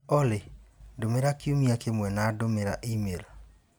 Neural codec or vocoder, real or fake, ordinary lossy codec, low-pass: none; real; none; none